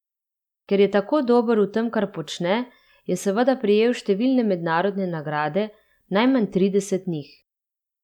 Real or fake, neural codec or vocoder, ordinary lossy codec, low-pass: real; none; none; 19.8 kHz